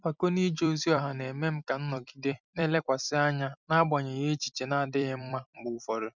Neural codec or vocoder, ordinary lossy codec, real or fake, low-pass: vocoder, 44.1 kHz, 128 mel bands every 256 samples, BigVGAN v2; none; fake; 7.2 kHz